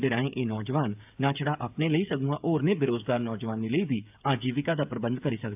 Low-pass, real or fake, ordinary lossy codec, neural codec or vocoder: 3.6 kHz; fake; AAC, 32 kbps; codec, 16 kHz, 8 kbps, FreqCodec, smaller model